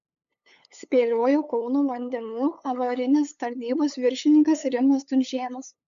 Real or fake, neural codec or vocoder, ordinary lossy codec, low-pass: fake; codec, 16 kHz, 8 kbps, FunCodec, trained on LibriTTS, 25 frames a second; AAC, 96 kbps; 7.2 kHz